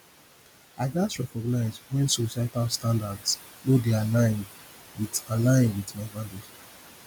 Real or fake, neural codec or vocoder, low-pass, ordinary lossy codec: real; none; none; none